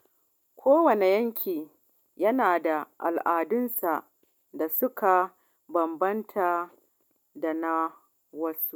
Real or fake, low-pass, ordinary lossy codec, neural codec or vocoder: real; 19.8 kHz; none; none